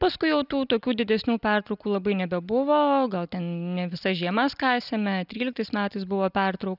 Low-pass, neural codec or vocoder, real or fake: 5.4 kHz; none; real